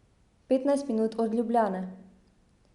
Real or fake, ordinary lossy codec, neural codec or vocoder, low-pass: real; Opus, 64 kbps; none; 10.8 kHz